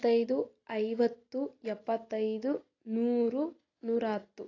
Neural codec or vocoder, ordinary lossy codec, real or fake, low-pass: none; AAC, 32 kbps; real; 7.2 kHz